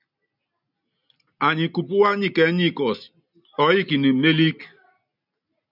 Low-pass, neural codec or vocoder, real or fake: 5.4 kHz; none; real